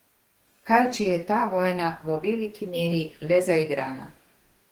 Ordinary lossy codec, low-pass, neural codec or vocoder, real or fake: Opus, 24 kbps; 19.8 kHz; codec, 44.1 kHz, 2.6 kbps, DAC; fake